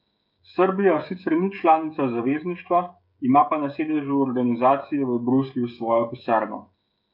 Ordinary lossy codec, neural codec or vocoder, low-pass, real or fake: none; codec, 16 kHz, 16 kbps, FreqCodec, smaller model; 5.4 kHz; fake